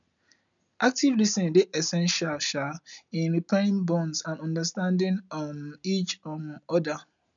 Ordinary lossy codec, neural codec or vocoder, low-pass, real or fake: none; none; 7.2 kHz; real